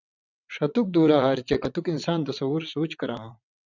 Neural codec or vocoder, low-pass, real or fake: vocoder, 22.05 kHz, 80 mel bands, WaveNeXt; 7.2 kHz; fake